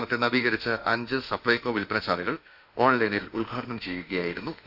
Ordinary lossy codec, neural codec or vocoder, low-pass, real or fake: none; autoencoder, 48 kHz, 32 numbers a frame, DAC-VAE, trained on Japanese speech; 5.4 kHz; fake